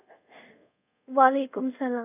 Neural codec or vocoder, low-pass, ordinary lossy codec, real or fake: codec, 24 kHz, 0.5 kbps, DualCodec; 3.6 kHz; none; fake